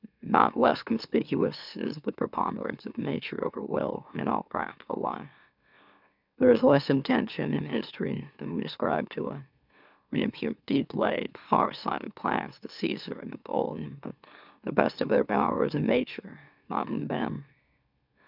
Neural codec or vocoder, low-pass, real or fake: autoencoder, 44.1 kHz, a latent of 192 numbers a frame, MeloTTS; 5.4 kHz; fake